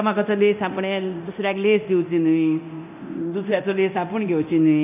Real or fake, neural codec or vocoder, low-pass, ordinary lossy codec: fake; codec, 24 kHz, 0.9 kbps, DualCodec; 3.6 kHz; none